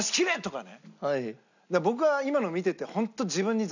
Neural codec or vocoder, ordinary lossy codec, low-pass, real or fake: none; none; 7.2 kHz; real